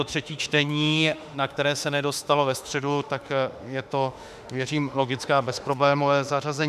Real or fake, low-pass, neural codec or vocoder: fake; 14.4 kHz; autoencoder, 48 kHz, 32 numbers a frame, DAC-VAE, trained on Japanese speech